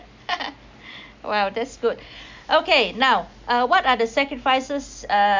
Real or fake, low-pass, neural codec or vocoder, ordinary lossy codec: real; 7.2 kHz; none; MP3, 64 kbps